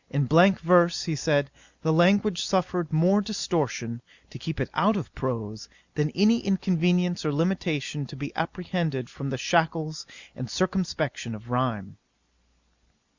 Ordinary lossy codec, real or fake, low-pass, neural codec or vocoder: Opus, 64 kbps; real; 7.2 kHz; none